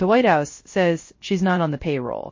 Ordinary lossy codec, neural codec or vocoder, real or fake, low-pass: MP3, 32 kbps; codec, 16 kHz, 0.3 kbps, FocalCodec; fake; 7.2 kHz